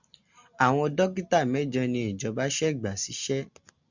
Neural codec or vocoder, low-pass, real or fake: none; 7.2 kHz; real